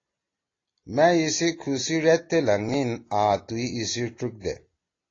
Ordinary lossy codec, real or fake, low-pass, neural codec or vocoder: AAC, 32 kbps; real; 7.2 kHz; none